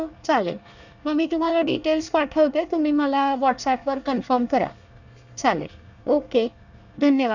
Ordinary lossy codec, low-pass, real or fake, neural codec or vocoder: none; 7.2 kHz; fake; codec, 24 kHz, 1 kbps, SNAC